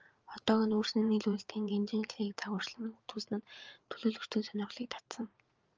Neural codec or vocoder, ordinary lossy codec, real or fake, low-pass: vocoder, 44.1 kHz, 80 mel bands, Vocos; Opus, 24 kbps; fake; 7.2 kHz